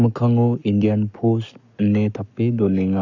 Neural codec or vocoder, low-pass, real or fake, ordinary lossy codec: codec, 44.1 kHz, 7.8 kbps, Pupu-Codec; 7.2 kHz; fake; none